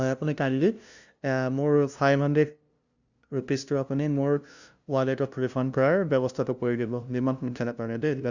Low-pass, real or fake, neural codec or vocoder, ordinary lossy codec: 7.2 kHz; fake; codec, 16 kHz, 0.5 kbps, FunCodec, trained on LibriTTS, 25 frames a second; Opus, 64 kbps